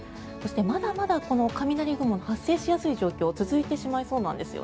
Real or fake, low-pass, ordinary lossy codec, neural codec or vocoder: real; none; none; none